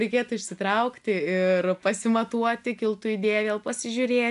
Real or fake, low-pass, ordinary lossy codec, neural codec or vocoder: real; 10.8 kHz; AAC, 96 kbps; none